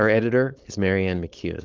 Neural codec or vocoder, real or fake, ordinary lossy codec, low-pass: codec, 16 kHz, 4.8 kbps, FACodec; fake; Opus, 24 kbps; 7.2 kHz